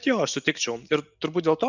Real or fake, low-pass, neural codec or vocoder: real; 7.2 kHz; none